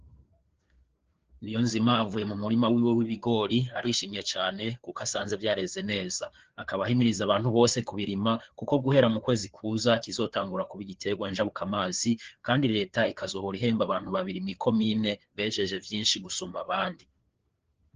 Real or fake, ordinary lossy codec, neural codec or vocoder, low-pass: fake; Opus, 16 kbps; codec, 16 kHz, 4 kbps, FreqCodec, larger model; 7.2 kHz